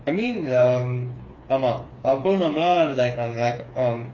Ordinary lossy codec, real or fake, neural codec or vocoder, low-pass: AAC, 32 kbps; fake; codec, 16 kHz, 4 kbps, FreqCodec, smaller model; 7.2 kHz